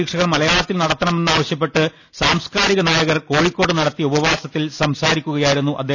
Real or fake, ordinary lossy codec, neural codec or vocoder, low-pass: real; none; none; 7.2 kHz